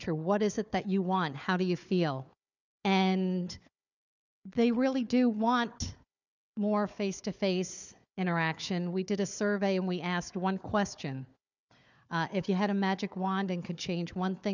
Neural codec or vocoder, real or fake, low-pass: codec, 16 kHz, 4 kbps, FunCodec, trained on Chinese and English, 50 frames a second; fake; 7.2 kHz